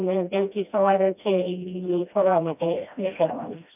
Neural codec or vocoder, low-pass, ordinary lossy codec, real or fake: codec, 16 kHz, 1 kbps, FreqCodec, smaller model; 3.6 kHz; none; fake